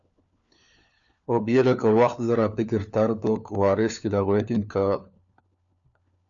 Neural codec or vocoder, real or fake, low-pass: codec, 16 kHz, 4 kbps, FunCodec, trained on LibriTTS, 50 frames a second; fake; 7.2 kHz